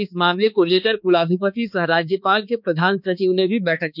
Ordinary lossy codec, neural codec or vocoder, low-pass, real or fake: none; codec, 16 kHz, 2 kbps, X-Codec, HuBERT features, trained on balanced general audio; 5.4 kHz; fake